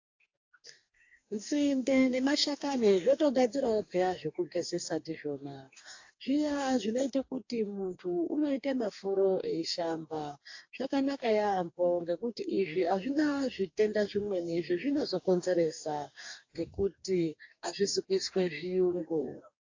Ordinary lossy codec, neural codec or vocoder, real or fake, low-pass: AAC, 48 kbps; codec, 44.1 kHz, 2.6 kbps, DAC; fake; 7.2 kHz